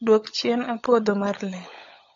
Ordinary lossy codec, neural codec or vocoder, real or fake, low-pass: AAC, 32 kbps; codec, 16 kHz, 16 kbps, FunCodec, trained on LibriTTS, 50 frames a second; fake; 7.2 kHz